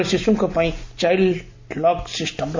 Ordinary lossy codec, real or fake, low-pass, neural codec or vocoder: MP3, 64 kbps; real; 7.2 kHz; none